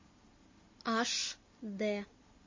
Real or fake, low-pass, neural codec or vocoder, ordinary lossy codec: real; 7.2 kHz; none; MP3, 32 kbps